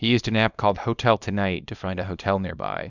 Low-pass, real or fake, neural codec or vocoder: 7.2 kHz; fake; codec, 24 kHz, 0.9 kbps, WavTokenizer, small release